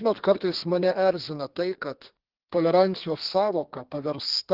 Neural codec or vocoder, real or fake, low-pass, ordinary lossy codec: codec, 32 kHz, 1.9 kbps, SNAC; fake; 5.4 kHz; Opus, 16 kbps